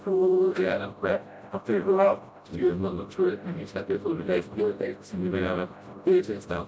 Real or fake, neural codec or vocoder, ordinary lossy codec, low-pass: fake; codec, 16 kHz, 0.5 kbps, FreqCodec, smaller model; none; none